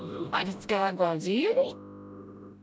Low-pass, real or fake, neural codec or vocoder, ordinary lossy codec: none; fake; codec, 16 kHz, 0.5 kbps, FreqCodec, smaller model; none